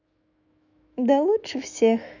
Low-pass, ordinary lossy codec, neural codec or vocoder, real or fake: 7.2 kHz; none; autoencoder, 48 kHz, 128 numbers a frame, DAC-VAE, trained on Japanese speech; fake